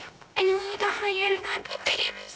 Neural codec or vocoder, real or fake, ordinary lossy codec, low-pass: codec, 16 kHz, 0.3 kbps, FocalCodec; fake; none; none